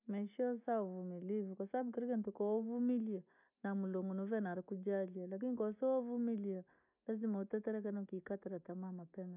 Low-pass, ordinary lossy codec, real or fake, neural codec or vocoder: 3.6 kHz; none; real; none